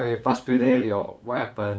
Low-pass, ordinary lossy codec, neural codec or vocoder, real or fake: none; none; codec, 16 kHz, 8 kbps, FunCodec, trained on LibriTTS, 25 frames a second; fake